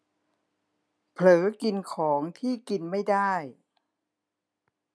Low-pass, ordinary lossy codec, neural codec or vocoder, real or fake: none; none; none; real